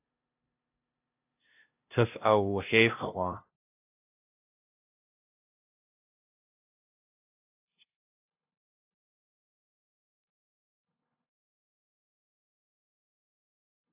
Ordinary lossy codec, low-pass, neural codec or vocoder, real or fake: AAC, 32 kbps; 3.6 kHz; codec, 16 kHz, 0.5 kbps, FunCodec, trained on LibriTTS, 25 frames a second; fake